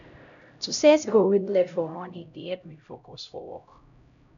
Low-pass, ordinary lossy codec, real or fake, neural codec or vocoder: 7.2 kHz; none; fake; codec, 16 kHz, 0.5 kbps, X-Codec, HuBERT features, trained on LibriSpeech